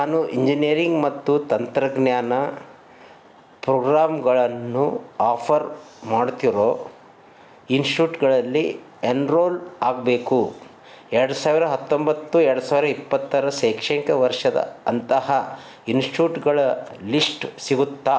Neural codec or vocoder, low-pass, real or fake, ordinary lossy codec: none; none; real; none